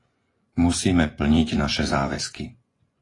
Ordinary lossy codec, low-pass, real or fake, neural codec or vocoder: AAC, 32 kbps; 10.8 kHz; fake; vocoder, 24 kHz, 100 mel bands, Vocos